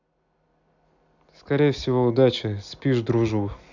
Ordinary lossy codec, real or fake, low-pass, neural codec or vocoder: none; real; 7.2 kHz; none